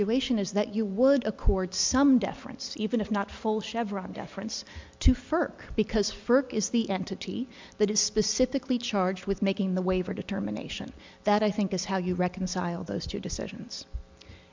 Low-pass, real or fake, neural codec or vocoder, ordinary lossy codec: 7.2 kHz; real; none; MP3, 64 kbps